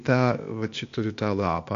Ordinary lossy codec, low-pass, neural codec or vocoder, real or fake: MP3, 48 kbps; 7.2 kHz; codec, 16 kHz, 0.8 kbps, ZipCodec; fake